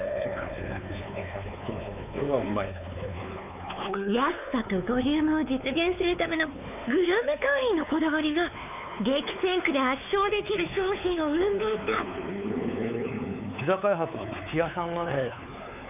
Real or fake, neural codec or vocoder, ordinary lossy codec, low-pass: fake; codec, 16 kHz, 4 kbps, X-Codec, WavLM features, trained on Multilingual LibriSpeech; none; 3.6 kHz